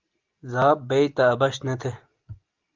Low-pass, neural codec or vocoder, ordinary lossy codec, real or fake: 7.2 kHz; none; Opus, 32 kbps; real